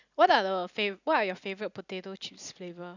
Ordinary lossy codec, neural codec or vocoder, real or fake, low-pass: none; none; real; 7.2 kHz